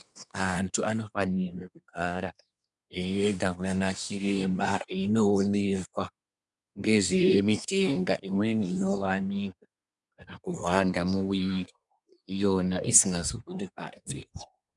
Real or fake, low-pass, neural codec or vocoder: fake; 10.8 kHz; codec, 24 kHz, 1 kbps, SNAC